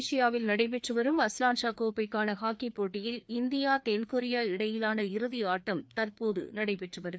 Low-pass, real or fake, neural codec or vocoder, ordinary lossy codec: none; fake; codec, 16 kHz, 2 kbps, FreqCodec, larger model; none